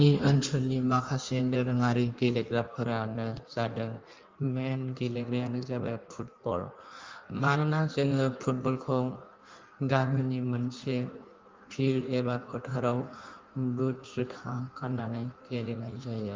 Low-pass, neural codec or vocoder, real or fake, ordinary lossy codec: 7.2 kHz; codec, 16 kHz in and 24 kHz out, 1.1 kbps, FireRedTTS-2 codec; fake; Opus, 32 kbps